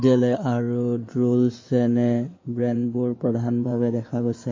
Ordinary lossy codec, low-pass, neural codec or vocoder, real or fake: MP3, 32 kbps; 7.2 kHz; codec, 16 kHz in and 24 kHz out, 2.2 kbps, FireRedTTS-2 codec; fake